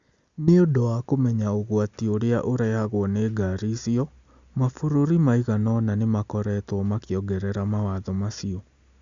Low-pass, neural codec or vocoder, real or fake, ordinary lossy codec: 7.2 kHz; none; real; Opus, 64 kbps